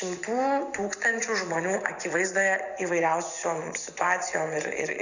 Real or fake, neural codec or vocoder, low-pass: fake; vocoder, 24 kHz, 100 mel bands, Vocos; 7.2 kHz